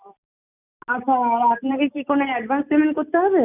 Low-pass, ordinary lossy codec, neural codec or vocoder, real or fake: 3.6 kHz; none; none; real